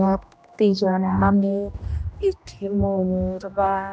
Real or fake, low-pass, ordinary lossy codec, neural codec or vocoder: fake; none; none; codec, 16 kHz, 1 kbps, X-Codec, HuBERT features, trained on general audio